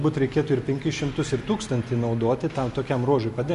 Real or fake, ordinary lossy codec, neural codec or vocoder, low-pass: real; MP3, 48 kbps; none; 14.4 kHz